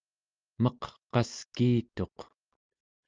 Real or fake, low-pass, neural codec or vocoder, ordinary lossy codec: real; 7.2 kHz; none; Opus, 24 kbps